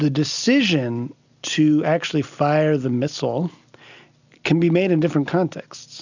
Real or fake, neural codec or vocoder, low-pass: real; none; 7.2 kHz